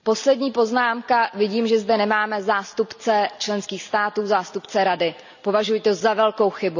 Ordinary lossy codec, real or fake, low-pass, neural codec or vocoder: none; real; 7.2 kHz; none